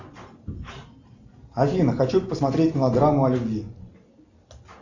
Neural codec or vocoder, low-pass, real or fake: none; 7.2 kHz; real